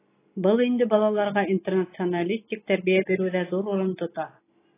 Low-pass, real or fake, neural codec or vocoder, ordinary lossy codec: 3.6 kHz; real; none; AAC, 16 kbps